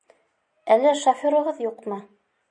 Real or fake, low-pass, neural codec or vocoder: real; 9.9 kHz; none